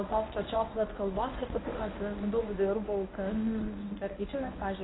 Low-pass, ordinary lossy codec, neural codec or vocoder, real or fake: 7.2 kHz; AAC, 16 kbps; codec, 24 kHz, 0.9 kbps, WavTokenizer, medium speech release version 1; fake